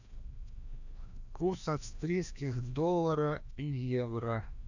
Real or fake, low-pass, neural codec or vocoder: fake; 7.2 kHz; codec, 16 kHz, 1 kbps, FreqCodec, larger model